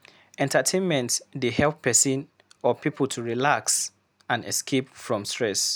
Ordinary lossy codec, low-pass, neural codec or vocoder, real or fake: none; none; none; real